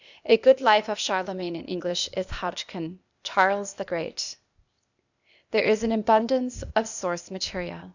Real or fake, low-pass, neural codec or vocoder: fake; 7.2 kHz; codec, 16 kHz, 0.8 kbps, ZipCodec